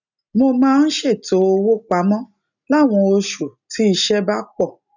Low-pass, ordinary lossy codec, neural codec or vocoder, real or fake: 7.2 kHz; none; none; real